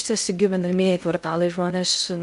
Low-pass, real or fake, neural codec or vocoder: 10.8 kHz; fake; codec, 16 kHz in and 24 kHz out, 0.6 kbps, FocalCodec, streaming, 2048 codes